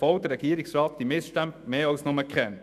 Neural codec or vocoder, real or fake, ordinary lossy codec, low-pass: autoencoder, 48 kHz, 128 numbers a frame, DAC-VAE, trained on Japanese speech; fake; none; 14.4 kHz